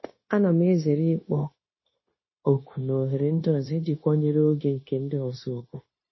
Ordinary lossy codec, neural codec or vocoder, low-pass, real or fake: MP3, 24 kbps; codec, 16 kHz, 0.9 kbps, LongCat-Audio-Codec; 7.2 kHz; fake